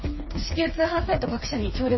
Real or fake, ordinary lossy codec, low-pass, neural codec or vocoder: fake; MP3, 24 kbps; 7.2 kHz; codec, 16 kHz, 4 kbps, FreqCodec, smaller model